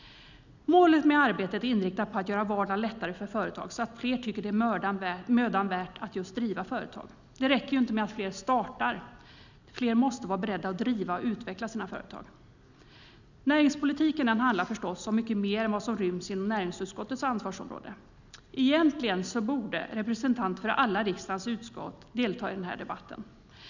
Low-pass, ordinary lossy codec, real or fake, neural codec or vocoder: 7.2 kHz; none; real; none